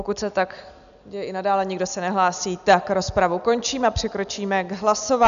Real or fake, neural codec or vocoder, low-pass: real; none; 7.2 kHz